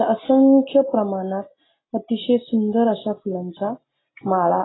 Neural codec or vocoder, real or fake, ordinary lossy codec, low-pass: none; real; AAC, 16 kbps; 7.2 kHz